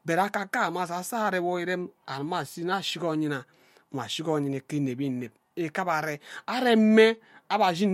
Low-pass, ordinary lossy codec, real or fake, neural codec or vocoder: 19.8 kHz; MP3, 64 kbps; real; none